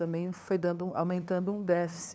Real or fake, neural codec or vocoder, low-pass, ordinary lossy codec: fake; codec, 16 kHz, 2 kbps, FunCodec, trained on LibriTTS, 25 frames a second; none; none